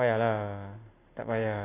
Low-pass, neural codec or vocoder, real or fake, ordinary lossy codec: 3.6 kHz; none; real; AAC, 16 kbps